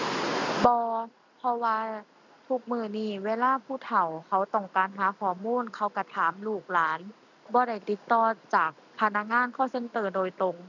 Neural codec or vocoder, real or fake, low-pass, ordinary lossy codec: none; real; 7.2 kHz; none